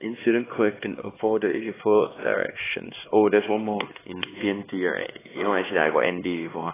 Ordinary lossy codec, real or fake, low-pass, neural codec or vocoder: AAC, 16 kbps; fake; 3.6 kHz; codec, 16 kHz, 2 kbps, X-Codec, HuBERT features, trained on LibriSpeech